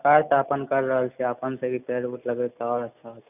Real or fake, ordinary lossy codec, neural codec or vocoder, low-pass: real; none; none; 3.6 kHz